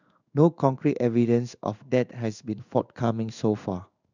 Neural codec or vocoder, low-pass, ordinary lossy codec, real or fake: codec, 16 kHz in and 24 kHz out, 1 kbps, XY-Tokenizer; 7.2 kHz; none; fake